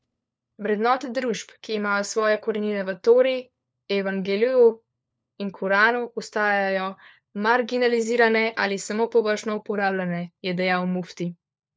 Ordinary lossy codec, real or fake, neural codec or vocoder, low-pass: none; fake; codec, 16 kHz, 4 kbps, FunCodec, trained on LibriTTS, 50 frames a second; none